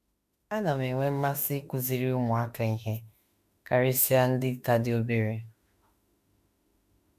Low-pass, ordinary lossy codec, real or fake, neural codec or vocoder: 14.4 kHz; none; fake; autoencoder, 48 kHz, 32 numbers a frame, DAC-VAE, trained on Japanese speech